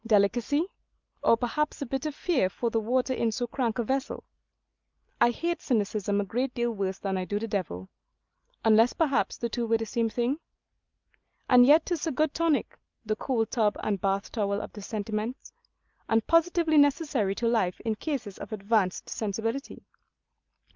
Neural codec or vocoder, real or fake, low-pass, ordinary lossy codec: none; real; 7.2 kHz; Opus, 24 kbps